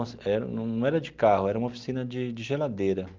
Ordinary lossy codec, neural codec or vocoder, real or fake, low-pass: Opus, 32 kbps; none; real; 7.2 kHz